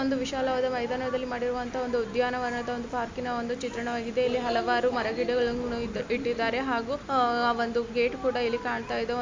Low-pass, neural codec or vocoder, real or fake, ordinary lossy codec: 7.2 kHz; none; real; MP3, 64 kbps